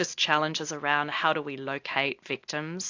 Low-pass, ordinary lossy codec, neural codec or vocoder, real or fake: 7.2 kHz; AAC, 48 kbps; none; real